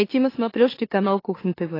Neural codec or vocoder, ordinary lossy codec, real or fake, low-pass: autoencoder, 44.1 kHz, a latent of 192 numbers a frame, MeloTTS; AAC, 24 kbps; fake; 5.4 kHz